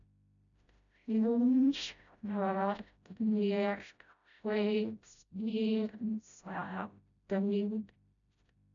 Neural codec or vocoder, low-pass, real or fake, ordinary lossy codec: codec, 16 kHz, 0.5 kbps, FreqCodec, smaller model; 7.2 kHz; fake; none